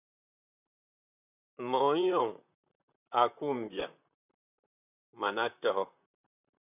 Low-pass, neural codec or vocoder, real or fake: 3.6 kHz; vocoder, 44.1 kHz, 128 mel bands, Pupu-Vocoder; fake